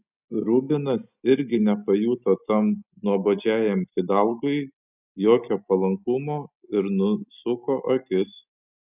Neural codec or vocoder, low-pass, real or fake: none; 3.6 kHz; real